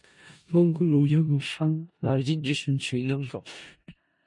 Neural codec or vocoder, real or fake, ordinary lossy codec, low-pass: codec, 16 kHz in and 24 kHz out, 0.4 kbps, LongCat-Audio-Codec, four codebook decoder; fake; MP3, 48 kbps; 10.8 kHz